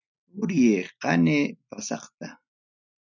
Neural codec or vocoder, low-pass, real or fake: none; 7.2 kHz; real